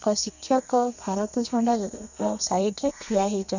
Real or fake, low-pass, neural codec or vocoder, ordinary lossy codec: fake; 7.2 kHz; codec, 32 kHz, 1.9 kbps, SNAC; none